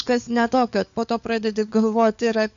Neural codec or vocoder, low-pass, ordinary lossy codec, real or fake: codec, 16 kHz, 4 kbps, FunCodec, trained on LibriTTS, 50 frames a second; 7.2 kHz; AAC, 96 kbps; fake